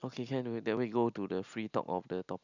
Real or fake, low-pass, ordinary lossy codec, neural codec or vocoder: real; 7.2 kHz; none; none